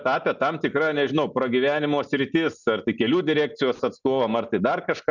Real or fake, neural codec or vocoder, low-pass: real; none; 7.2 kHz